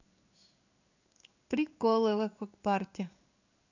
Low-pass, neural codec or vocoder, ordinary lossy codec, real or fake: 7.2 kHz; codec, 16 kHz in and 24 kHz out, 1 kbps, XY-Tokenizer; none; fake